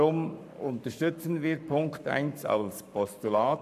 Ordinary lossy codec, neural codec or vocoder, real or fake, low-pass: none; codec, 44.1 kHz, 7.8 kbps, Pupu-Codec; fake; 14.4 kHz